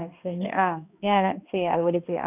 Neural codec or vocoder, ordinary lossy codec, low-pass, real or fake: codec, 16 kHz, 1 kbps, X-Codec, HuBERT features, trained on balanced general audio; none; 3.6 kHz; fake